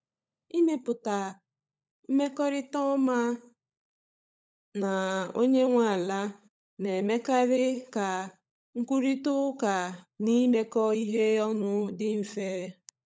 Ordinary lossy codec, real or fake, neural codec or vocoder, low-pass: none; fake; codec, 16 kHz, 16 kbps, FunCodec, trained on LibriTTS, 50 frames a second; none